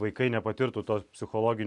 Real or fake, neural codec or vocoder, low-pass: real; none; 10.8 kHz